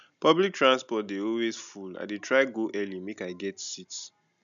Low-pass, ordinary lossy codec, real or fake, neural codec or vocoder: 7.2 kHz; none; real; none